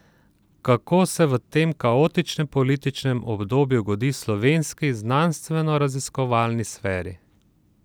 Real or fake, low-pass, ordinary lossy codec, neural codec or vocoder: real; none; none; none